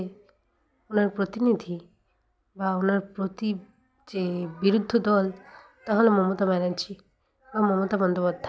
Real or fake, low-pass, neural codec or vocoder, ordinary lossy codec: real; none; none; none